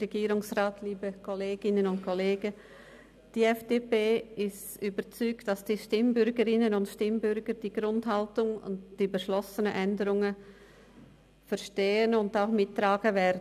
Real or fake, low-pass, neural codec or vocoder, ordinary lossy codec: real; 14.4 kHz; none; none